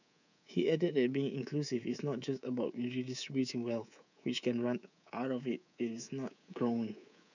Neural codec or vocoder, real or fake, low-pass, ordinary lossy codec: codec, 24 kHz, 3.1 kbps, DualCodec; fake; 7.2 kHz; none